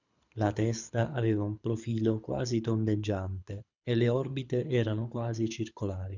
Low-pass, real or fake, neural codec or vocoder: 7.2 kHz; fake; codec, 24 kHz, 6 kbps, HILCodec